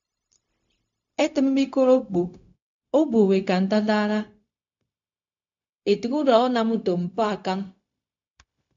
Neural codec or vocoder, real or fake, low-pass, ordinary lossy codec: codec, 16 kHz, 0.4 kbps, LongCat-Audio-Codec; fake; 7.2 kHz; AAC, 64 kbps